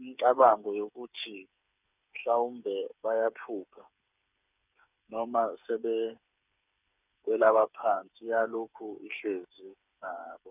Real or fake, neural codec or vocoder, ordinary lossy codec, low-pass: fake; codec, 16 kHz, 8 kbps, FreqCodec, smaller model; none; 3.6 kHz